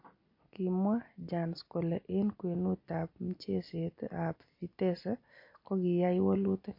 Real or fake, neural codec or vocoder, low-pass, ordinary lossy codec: real; none; 5.4 kHz; MP3, 32 kbps